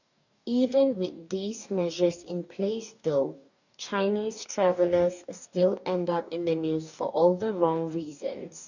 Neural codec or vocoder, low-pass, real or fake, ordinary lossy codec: codec, 44.1 kHz, 2.6 kbps, DAC; 7.2 kHz; fake; none